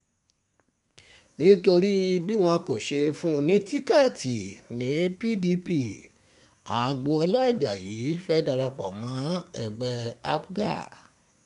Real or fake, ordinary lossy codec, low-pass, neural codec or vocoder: fake; none; 10.8 kHz; codec, 24 kHz, 1 kbps, SNAC